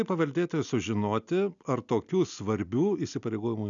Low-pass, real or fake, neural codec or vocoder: 7.2 kHz; real; none